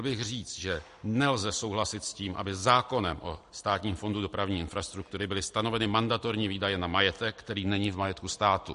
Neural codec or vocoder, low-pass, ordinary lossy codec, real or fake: none; 10.8 kHz; MP3, 48 kbps; real